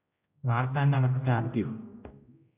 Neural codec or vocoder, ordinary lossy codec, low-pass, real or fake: codec, 16 kHz, 0.5 kbps, X-Codec, HuBERT features, trained on general audio; AAC, 32 kbps; 3.6 kHz; fake